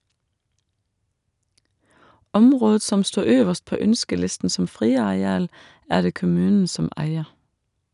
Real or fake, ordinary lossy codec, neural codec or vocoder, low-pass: real; none; none; 10.8 kHz